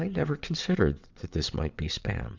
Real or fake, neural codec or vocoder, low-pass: fake; vocoder, 22.05 kHz, 80 mel bands, WaveNeXt; 7.2 kHz